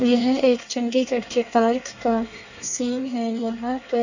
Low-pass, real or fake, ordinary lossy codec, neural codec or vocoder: 7.2 kHz; fake; none; codec, 24 kHz, 1 kbps, SNAC